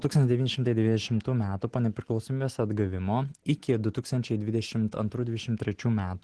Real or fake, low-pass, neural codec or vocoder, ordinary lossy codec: real; 10.8 kHz; none; Opus, 16 kbps